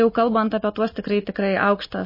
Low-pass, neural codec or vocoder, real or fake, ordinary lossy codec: 5.4 kHz; none; real; MP3, 24 kbps